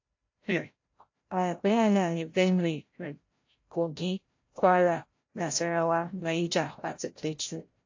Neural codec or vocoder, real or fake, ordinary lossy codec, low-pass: codec, 16 kHz, 0.5 kbps, FreqCodec, larger model; fake; AAC, 48 kbps; 7.2 kHz